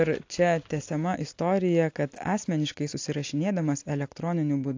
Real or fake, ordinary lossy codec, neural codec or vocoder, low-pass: real; AAC, 48 kbps; none; 7.2 kHz